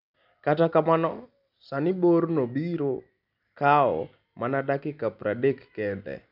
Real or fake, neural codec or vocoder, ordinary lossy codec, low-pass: real; none; none; 5.4 kHz